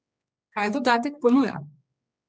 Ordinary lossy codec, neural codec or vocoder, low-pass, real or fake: none; codec, 16 kHz, 2 kbps, X-Codec, HuBERT features, trained on general audio; none; fake